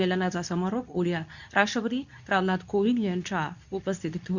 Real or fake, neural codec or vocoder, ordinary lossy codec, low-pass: fake; codec, 24 kHz, 0.9 kbps, WavTokenizer, medium speech release version 2; none; 7.2 kHz